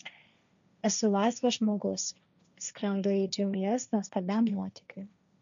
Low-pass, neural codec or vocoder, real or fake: 7.2 kHz; codec, 16 kHz, 1.1 kbps, Voila-Tokenizer; fake